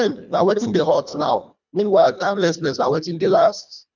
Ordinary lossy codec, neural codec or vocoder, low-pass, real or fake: none; codec, 24 kHz, 1.5 kbps, HILCodec; 7.2 kHz; fake